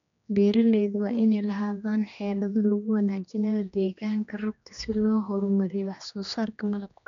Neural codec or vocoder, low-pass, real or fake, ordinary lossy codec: codec, 16 kHz, 2 kbps, X-Codec, HuBERT features, trained on general audio; 7.2 kHz; fake; none